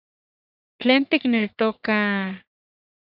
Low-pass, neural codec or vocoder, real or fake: 5.4 kHz; codec, 44.1 kHz, 3.4 kbps, Pupu-Codec; fake